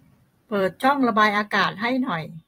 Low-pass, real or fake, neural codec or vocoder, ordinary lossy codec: 19.8 kHz; fake; vocoder, 44.1 kHz, 128 mel bands every 256 samples, BigVGAN v2; AAC, 48 kbps